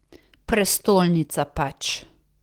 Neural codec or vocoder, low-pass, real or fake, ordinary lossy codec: none; 19.8 kHz; real; Opus, 16 kbps